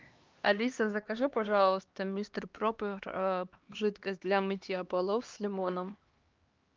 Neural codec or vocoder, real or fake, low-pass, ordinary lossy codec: codec, 16 kHz, 2 kbps, X-Codec, HuBERT features, trained on LibriSpeech; fake; 7.2 kHz; Opus, 24 kbps